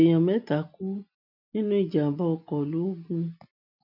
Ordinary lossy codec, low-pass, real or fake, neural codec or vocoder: none; 5.4 kHz; real; none